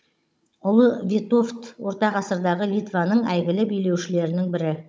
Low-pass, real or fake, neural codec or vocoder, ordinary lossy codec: none; fake; codec, 16 kHz, 16 kbps, FunCodec, trained on Chinese and English, 50 frames a second; none